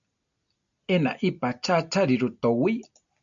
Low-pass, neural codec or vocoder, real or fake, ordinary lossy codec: 7.2 kHz; none; real; MP3, 96 kbps